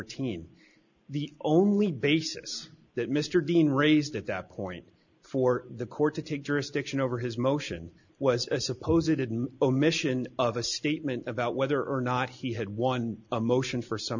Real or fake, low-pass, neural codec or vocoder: real; 7.2 kHz; none